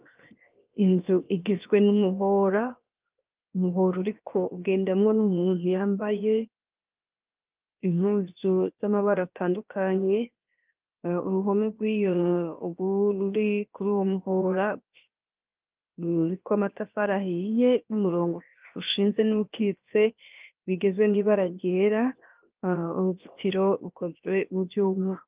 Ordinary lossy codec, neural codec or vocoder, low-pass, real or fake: Opus, 32 kbps; codec, 16 kHz, 0.7 kbps, FocalCodec; 3.6 kHz; fake